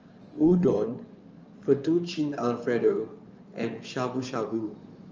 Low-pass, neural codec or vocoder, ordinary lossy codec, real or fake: 7.2 kHz; codec, 16 kHz in and 24 kHz out, 2.2 kbps, FireRedTTS-2 codec; Opus, 24 kbps; fake